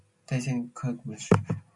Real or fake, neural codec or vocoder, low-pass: real; none; 10.8 kHz